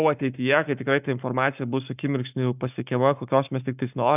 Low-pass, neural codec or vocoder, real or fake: 3.6 kHz; codec, 44.1 kHz, 7.8 kbps, Pupu-Codec; fake